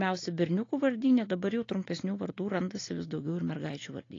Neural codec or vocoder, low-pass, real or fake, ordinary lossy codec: none; 7.2 kHz; real; AAC, 32 kbps